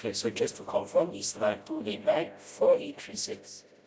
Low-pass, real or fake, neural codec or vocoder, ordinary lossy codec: none; fake; codec, 16 kHz, 0.5 kbps, FreqCodec, smaller model; none